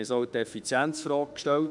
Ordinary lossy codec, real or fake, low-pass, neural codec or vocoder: none; fake; 10.8 kHz; autoencoder, 48 kHz, 32 numbers a frame, DAC-VAE, trained on Japanese speech